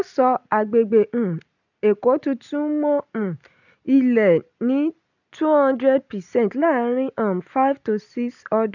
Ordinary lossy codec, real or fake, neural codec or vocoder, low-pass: none; real; none; 7.2 kHz